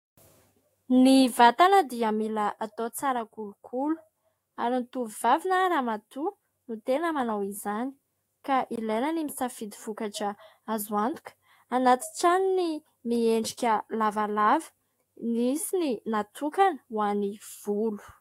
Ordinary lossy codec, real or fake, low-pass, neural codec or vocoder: AAC, 48 kbps; fake; 19.8 kHz; autoencoder, 48 kHz, 128 numbers a frame, DAC-VAE, trained on Japanese speech